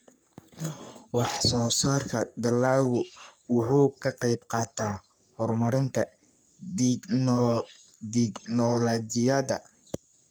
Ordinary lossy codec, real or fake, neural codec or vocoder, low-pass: none; fake; codec, 44.1 kHz, 3.4 kbps, Pupu-Codec; none